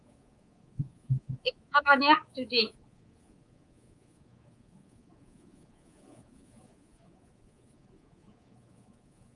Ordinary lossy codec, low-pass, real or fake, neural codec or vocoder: Opus, 32 kbps; 10.8 kHz; fake; codec, 24 kHz, 3.1 kbps, DualCodec